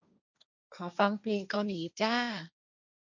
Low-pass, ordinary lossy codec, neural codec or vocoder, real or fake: 7.2 kHz; none; codec, 16 kHz, 1.1 kbps, Voila-Tokenizer; fake